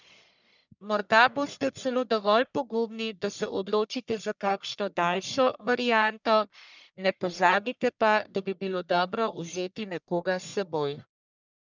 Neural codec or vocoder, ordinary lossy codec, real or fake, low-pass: codec, 44.1 kHz, 1.7 kbps, Pupu-Codec; none; fake; 7.2 kHz